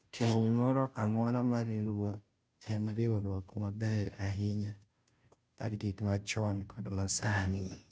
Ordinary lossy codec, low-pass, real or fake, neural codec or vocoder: none; none; fake; codec, 16 kHz, 0.5 kbps, FunCodec, trained on Chinese and English, 25 frames a second